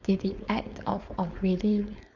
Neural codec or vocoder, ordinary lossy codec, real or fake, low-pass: codec, 16 kHz, 4.8 kbps, FACodec; Opus, 64 kbps; fake; 7.2 kHz